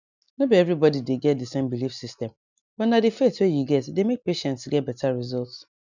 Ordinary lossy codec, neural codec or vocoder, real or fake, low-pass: none; none; real; 7.2 kHz